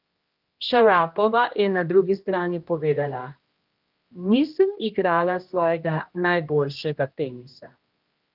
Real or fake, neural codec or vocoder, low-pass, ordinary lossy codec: fake; codec, 16 kHz, 1 kbps, X-Codec, HuBERT features, trained on general audio; 5.4 kHz; Opus, 24 kbps